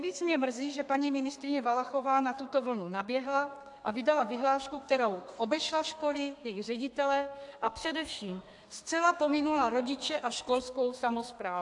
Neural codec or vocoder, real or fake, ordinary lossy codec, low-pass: codec, 32 kHz, 1.9 kbps, SNAC; fake; AAC, 64 kbps; 10.8 kHz